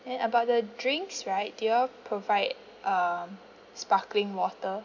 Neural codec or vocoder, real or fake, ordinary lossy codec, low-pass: none; real; none; 7.2 kHz